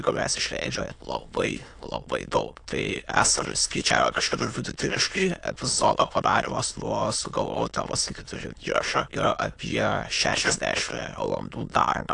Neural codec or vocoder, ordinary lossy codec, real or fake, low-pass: autoencoder, 22.05 kHz, a latent of 192 numbers a frame, VITS, trained on many speakers; AAC, 64 kbps; fake; 9.9 kHz